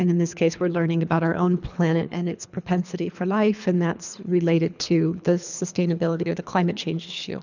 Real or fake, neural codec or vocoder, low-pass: fake; codec, 24 kHz, 3 kbps, HILCodec; 7.2 kHz